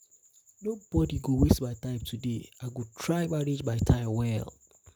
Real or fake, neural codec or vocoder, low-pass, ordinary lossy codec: real; none; none; none